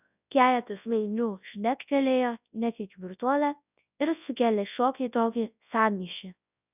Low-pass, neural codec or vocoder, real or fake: 3.6 kHz; codec, 24 kHz, 0.9 kbps, WavTokenizer, large speech release; fake